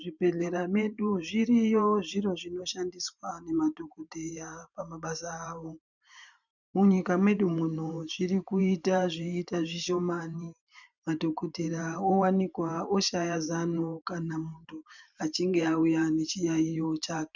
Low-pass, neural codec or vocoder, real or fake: 7.2 kHz; vocoder, 44.1 kHz, 128 mel bands every 512 samples, BigVGAN v2; fake